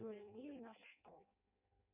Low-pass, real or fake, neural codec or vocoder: 3.6 kHz; fake; codec, 16 kHz in and 24 kHz out, 0.6 kbps, FireRedTTS-2 codec